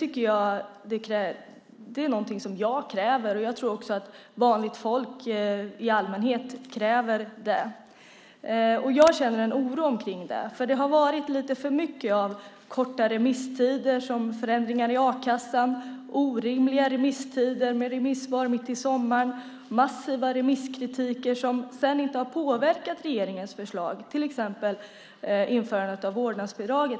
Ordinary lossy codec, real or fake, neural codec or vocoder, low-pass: none; real; none; none